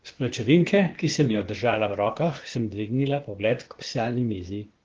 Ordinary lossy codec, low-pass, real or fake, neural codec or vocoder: Opus, 16 kbps; 7.2 kHz; fake; codec, 16 kHz, 0.8 kbps, ZipCodec